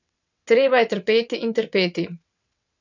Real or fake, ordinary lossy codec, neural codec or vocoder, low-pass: fake; none; vocoder, 22.05 kHz, 80 mel bands, WaveNeXt; 7.2 kHz